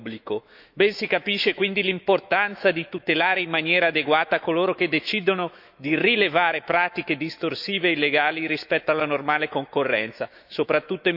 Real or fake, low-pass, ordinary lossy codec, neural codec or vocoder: fake; 5.4 kHz; none; autoencoder, 48 kHz, 128 numbers a frame, DAC-VAE, trained on Japanese speech